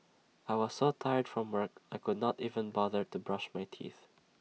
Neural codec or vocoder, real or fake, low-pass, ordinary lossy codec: none; real; none; none